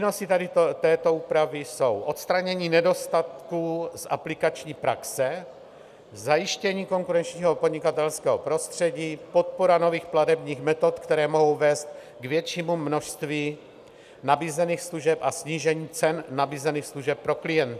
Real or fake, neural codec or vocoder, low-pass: real; none; 14.4 kHz